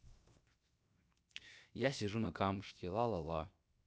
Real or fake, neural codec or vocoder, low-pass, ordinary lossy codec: fake; codec, 16 kHz, 0.7 kbps, FocalCodec; none; none